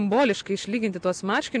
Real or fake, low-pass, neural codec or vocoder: real; 9.9 kHz; none